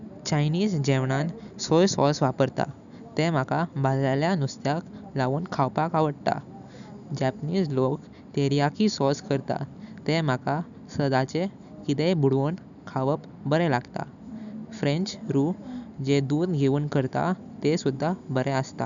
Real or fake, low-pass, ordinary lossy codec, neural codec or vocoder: real; 7.2 kHz; none; none